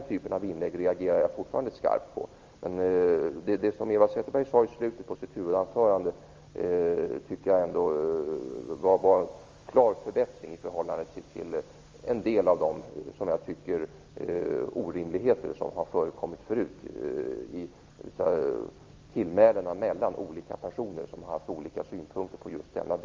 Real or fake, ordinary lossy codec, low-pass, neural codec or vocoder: real; Opus, 32 kbps; 7.2 kHz; none